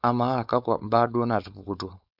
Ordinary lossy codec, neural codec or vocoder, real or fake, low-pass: none; codec, 16 kHz, 4.8 kbps, FACodec; fake; 5.4 kHz